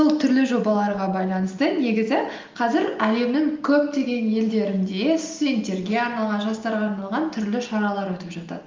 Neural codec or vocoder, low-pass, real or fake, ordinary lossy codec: none; 7.2 kHz; real; Opus, 24 kbps